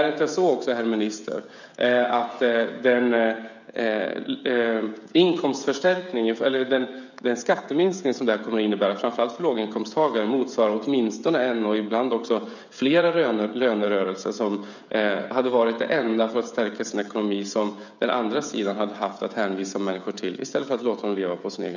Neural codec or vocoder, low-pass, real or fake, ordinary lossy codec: codec, 16 kHz, 16 kbps, FreqCodec, smaller model; 7.2 kHz; fake; none